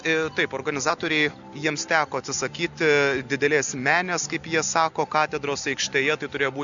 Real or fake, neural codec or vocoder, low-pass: real; none; 7.2 kHz